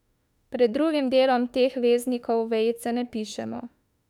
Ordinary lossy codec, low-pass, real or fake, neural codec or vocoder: none; 19.8 kHz; fake; autoencoder, 48 kHz, 32 numbers a frame, DAC-VAE, trained on Japanese speech